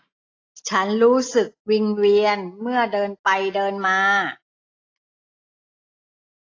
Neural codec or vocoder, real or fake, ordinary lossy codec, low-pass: none; real; AAC, 32 kbps; 7.2 kHz